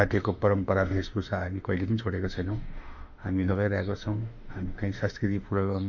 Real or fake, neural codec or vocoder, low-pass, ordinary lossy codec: fake; autoencoder, 48 kHz, 32 numbers a frame, DAC-VAE, trained on Japanese speech; 7.2 kHz; MP3, 64 kbps